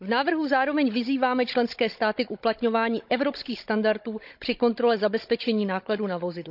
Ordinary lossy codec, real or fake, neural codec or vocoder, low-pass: none; fake; codec, 16 kHz, 16 kbps, FunCodec, trained on Chinese and English, 50 frames a second; 5.4 kHz